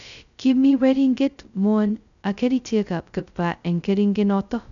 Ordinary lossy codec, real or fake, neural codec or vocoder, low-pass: none; fake; codec, 16 kHz, 0.2 kbps, FocalCodec; 7.2 kHz